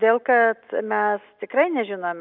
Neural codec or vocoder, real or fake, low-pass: none; real; 5.4 kHz